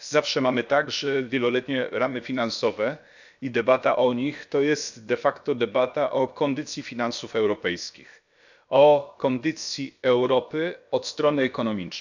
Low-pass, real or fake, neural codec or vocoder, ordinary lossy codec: 7.2 kHz; fake; codec, 16 kHz, about 1 kbps, DyCAST, with the encoder's durations; none